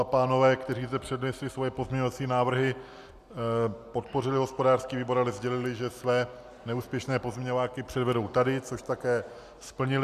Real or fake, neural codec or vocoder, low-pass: real; none; 14.4 kHz